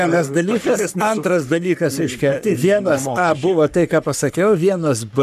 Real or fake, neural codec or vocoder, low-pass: fake; codec, 44.1 kHz, 3.4 kbps, Pupu-Codec; 14.4 kHz